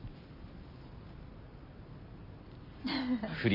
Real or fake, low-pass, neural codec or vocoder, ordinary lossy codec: real; 5.4 kHz; none; none